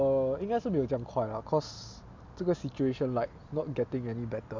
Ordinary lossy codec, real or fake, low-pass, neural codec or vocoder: MP3, 64 kbps; real; 7.2 kHz; none